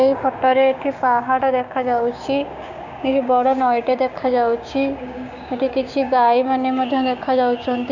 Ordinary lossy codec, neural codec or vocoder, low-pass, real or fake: none; codec, 16 kHz, 6 kbps, DAC; 7.2 kHz; fake